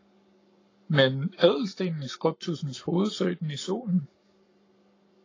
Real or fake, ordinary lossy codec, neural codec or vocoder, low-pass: fake; AAC, 32 kbps; codec, 44.1 kHz, 7.8 kbps, Pupu-Codec; 7.2 kHz